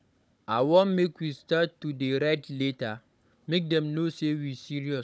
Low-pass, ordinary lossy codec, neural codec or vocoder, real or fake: none; none; codec, 16 kHz, 16 kbps, FunCodec, trained on Chinese and English, 50 frames a second; fake